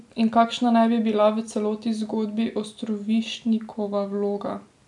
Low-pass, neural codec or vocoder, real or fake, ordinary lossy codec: 10.8 kHz; none; real; none